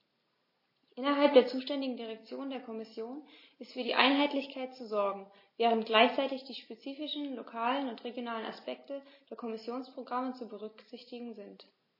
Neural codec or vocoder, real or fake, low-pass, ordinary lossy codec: none; real; 5.4 kHz; MP3, 24 kbps